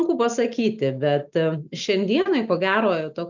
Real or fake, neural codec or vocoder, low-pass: real; none; 7.2 kHz